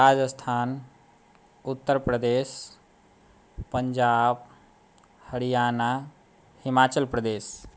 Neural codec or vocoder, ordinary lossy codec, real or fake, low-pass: none; none; real; none